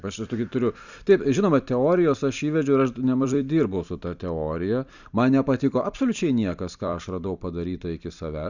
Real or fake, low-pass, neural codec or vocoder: fake; 7.2 kHz; vocoder, 44.1 kHz, 128 mel bands every 256 samples, BigVGAN v2